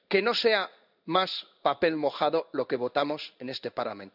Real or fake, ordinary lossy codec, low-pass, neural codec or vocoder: fake; none; 5.4 kHz; codec, 16 kHz in and 24 kHz out, 1 kbps, XY-Tokenizer